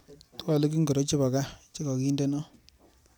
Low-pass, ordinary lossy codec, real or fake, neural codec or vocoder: none; none; real; none